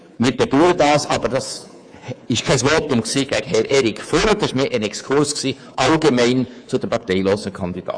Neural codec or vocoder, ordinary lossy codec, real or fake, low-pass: codec, 24 kHz, 3.1 kbps, DualCodec; AAC, 64 kbps; fake; 9.9 kHz